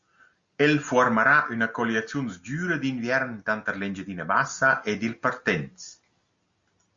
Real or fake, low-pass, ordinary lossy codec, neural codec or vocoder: real; 7.2 kHz; Opus, 64 kbps; none